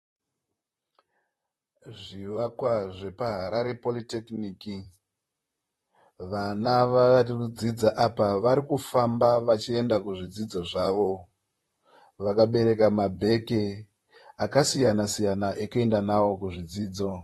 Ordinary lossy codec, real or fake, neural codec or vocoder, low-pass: AAC, 32 kbps; fake; vocoder, 44.1 kHz, 128 mel bands, Pupu-Vocoder; 19.8 kHz